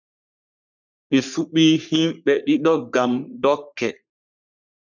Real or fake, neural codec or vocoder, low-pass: fake; codec, 44.1 kHz, 3.4 kbps, Pupu-Codec; 7.2 kHz